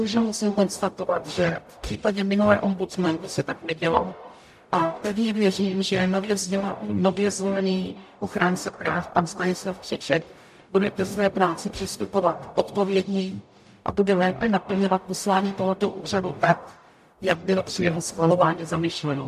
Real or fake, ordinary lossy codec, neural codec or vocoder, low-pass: fake; AAC, 96 kbps; codec, 44.1 kHz, 0.9 kbps, DAC; 14.4 kHz